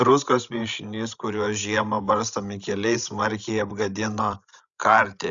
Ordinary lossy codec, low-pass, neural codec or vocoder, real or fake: Opus, 64 kbps; 7.2 kHz; codec, 16 kHz, 16 kbps, FreqCodec, larger model; fake